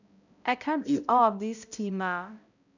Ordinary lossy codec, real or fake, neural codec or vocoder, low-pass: none; fake; codec, 16 kHz, 0.5 kbps, X-Codec, HuBERT features, trained on balanced general audio; 7.2 kHz